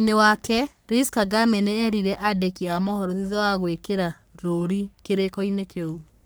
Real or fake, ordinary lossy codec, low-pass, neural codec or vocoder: fake; none; none; codec, 44.1 kHz, 3.4 kbps, Pupu-Codec